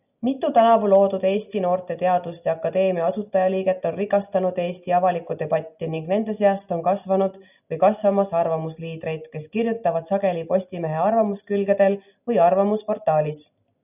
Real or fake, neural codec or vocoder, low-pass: real; none; 3.6 kHz